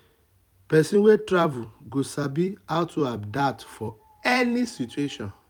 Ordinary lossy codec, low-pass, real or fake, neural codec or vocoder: none; 19.8 kHz; fake; vocoder, 44.1 kHz, 128 mel bands every 256 samples, BigVGAN v2